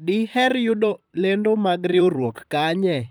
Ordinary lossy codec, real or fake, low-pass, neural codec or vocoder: none; fake; none; vocoder, 44.1 kHz, 128 mel bands, Pupu-Vocoder